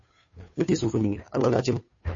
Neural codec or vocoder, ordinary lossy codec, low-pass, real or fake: codec, 16 kHz, 2 kbps, FunCodec, trained on Chinese and English, 25 frames a second; MP3, 32 kbps; 7.2 kHz; fake